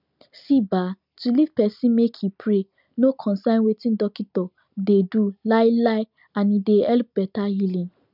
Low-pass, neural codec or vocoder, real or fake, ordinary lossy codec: 5.4 kHz; none; real; none